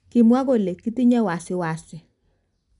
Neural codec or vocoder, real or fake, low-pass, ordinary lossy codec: none; real; 10.8 kHz; MP3, 96 kbps